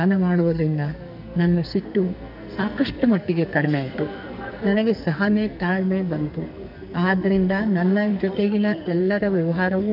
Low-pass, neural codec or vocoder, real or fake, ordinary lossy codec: 5.4 kHz; codec, 44.1 kHz, 2.6 kbps, SNAC; fake; none